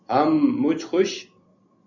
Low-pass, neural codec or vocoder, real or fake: 7.2 kHz; none; real